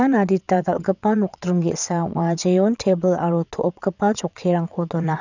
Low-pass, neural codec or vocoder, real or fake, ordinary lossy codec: 7.2 kHz; vocoder, 22.05 kHz, 80 mel bands, WaveNeXt; fake; none